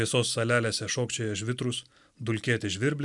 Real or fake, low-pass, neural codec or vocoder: real; 10.8 kHz; none